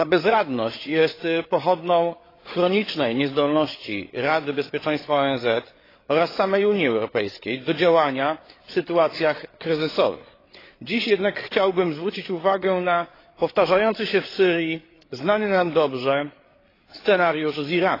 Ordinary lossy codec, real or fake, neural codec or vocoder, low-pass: AAC, 24 kbps; fake; codec, 16 kHz, 8 kbps, FreqCodec, larger model; 5.4 kHz